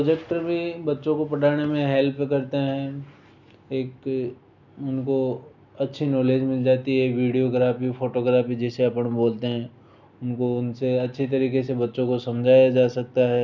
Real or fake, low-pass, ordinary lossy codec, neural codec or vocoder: real; 7.2 kHz; none; none